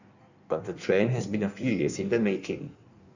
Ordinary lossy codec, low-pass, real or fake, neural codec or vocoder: none; 7.2 kHz; fake; codec, 16 kHz in and 24 kHz out, 1.1 kbps, FireRedTTS-2 codec